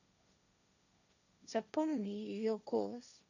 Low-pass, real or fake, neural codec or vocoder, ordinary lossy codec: 7.2 kHz; fake; codec, 16 kHz, 1.1 kbps, Voila-Tokenizer; none